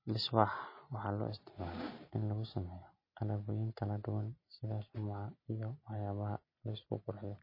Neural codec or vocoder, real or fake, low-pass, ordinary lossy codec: none; real; 5.4 kHz; MP3, 24 kbps